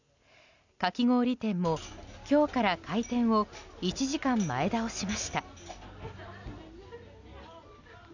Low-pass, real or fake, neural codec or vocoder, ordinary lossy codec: 7.2 kHz; real; none; none